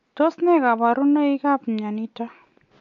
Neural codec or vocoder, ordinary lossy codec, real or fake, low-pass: none; MP3, 48 kbps; real; 7.2 kHz